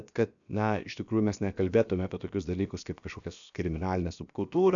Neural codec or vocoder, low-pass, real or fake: codec, 16 kHz, about 1 kbps, DyCAST, with the encoder's durations; 7.2 kHz; fake